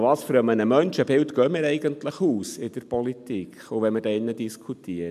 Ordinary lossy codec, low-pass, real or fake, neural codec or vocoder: MP3, 96 kbps; 14.4 kHz; real; none